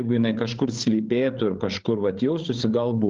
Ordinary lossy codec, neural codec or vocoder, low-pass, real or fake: Opus, 16 kbps; codec, 16 kHz, 8 kbps, FreqCodec, larger model; 7.2 kHz; fake